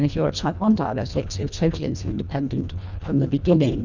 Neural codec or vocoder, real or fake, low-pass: codec, 24 kHz, 1.5 kbps, HILCodec; fake; 7.2 kHz